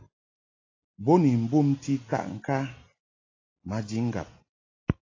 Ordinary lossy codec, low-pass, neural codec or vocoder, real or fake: AAC, 32 kbps; 7.2 kHz; none; real